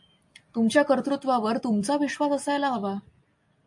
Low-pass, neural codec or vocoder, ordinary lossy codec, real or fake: 10.8 kHz; none; MP3, 48 kbps; real